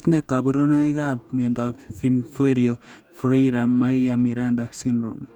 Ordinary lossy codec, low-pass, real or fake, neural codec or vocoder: none; 19.8 kHz; fake; codec, 44.1 kHz, 2.6 kbps, DAC